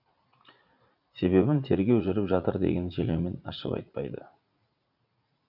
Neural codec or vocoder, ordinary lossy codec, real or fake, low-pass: vocoder, 44.1 kHz, 80 mel bands, Vocos; none; fake; 5.4 kHz